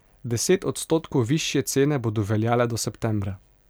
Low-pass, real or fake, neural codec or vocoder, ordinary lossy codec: none; real; none; none